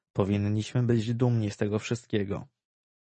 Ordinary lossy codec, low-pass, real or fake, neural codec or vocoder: MP3, 32 kbps; 10.8 kHz; real; none